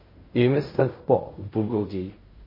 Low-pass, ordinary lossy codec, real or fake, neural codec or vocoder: 5.4 kHz; MP3, 24 kbps; fake; codec, 16 kHz in and 24 kHz out, 0.4 kbps, LongCat-Audio-Codec, fine tuned four codebook decoder